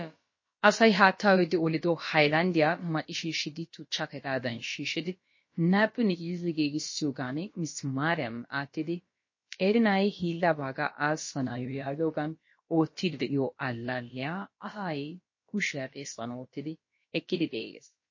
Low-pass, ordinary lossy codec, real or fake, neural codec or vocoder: 7.2 kHz; MP3, 32 kbps; fake; codec, 16 kHz, about 1 kbps, DyCAST, with the encoder's durations